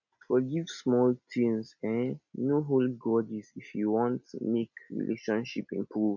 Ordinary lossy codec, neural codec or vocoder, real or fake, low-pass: none; none; real; 7.2 kHz